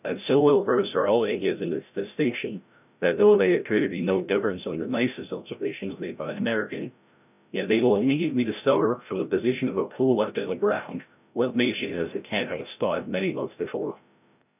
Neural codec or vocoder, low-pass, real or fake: codec, 16 kHz, 0.5 kbps, FreqCodec, larger model; 3.6 kHz; fake